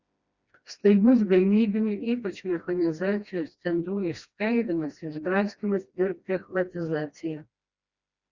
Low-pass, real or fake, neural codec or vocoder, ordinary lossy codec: 7.2 kHz; fake; codec, 16 kHz, 1 kbps, FreqCodec, smaller model; Opus, 64 kbps